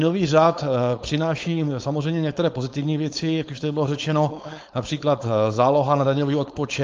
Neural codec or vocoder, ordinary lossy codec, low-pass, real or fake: codec, 16 kHz, 4.8 kbps, FACodec; Opus, 32 kbps; 7.2 kHz; fake